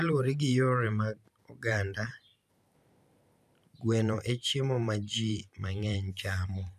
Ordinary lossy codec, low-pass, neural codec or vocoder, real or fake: none; 14.4 kHz; vocoder, 44.1 kHz, 128 mel bands every 512 samples, BigVGAN v2; fake